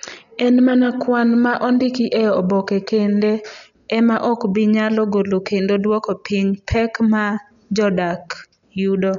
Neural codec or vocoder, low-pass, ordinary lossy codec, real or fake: none; 7.2 kHz; none; real